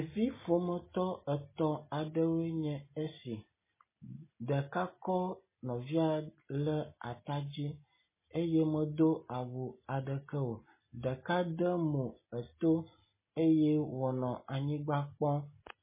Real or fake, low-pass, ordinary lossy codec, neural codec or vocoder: real; 3.6 kHz; MP3, 16 kbps; none